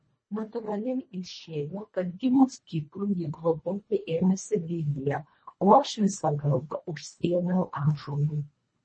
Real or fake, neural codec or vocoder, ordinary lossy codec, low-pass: fake; codec, 24 kHz, 1.5 kbps, HILCodec; MP3, 32 kbps; 9.9 kHz